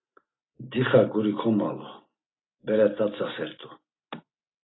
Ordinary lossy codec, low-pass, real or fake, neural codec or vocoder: AAC, 16 kbps; 7.2 kHz; real; none